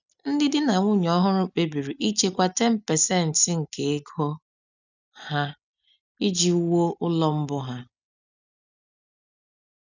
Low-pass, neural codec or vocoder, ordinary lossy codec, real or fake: 7.2 kHz; none; none; real